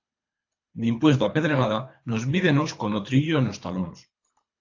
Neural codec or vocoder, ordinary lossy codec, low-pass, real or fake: codec, 24 kHz, 3 kbps, HILCodec; AAC, 48 kbps; 7.2 kHz; fake